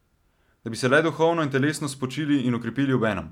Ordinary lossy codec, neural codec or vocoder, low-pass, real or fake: none; none; 19.8 kHz; real